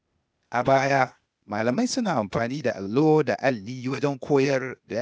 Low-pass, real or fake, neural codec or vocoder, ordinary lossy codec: none; fake; codec, 16 kHz, 0.8 kbps, ZipCodec; none